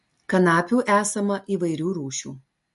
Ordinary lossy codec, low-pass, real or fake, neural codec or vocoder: MP3, 48 kbps; 14.4 kHz; real; none